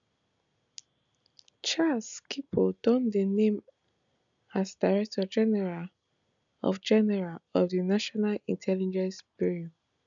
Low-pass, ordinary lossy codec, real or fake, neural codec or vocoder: 7.2 kHz; none; real; none